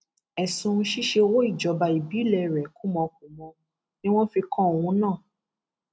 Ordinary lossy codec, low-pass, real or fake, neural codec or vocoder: none; none; real; none